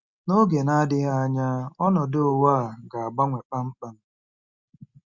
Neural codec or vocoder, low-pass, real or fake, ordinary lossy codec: none; 7.2 kHz; real; Opus, 64 kbps